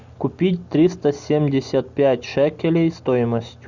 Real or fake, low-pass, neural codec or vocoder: real; 7.2 kHz; none